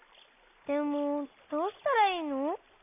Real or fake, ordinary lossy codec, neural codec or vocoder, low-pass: real; none; none; 3.6 kHz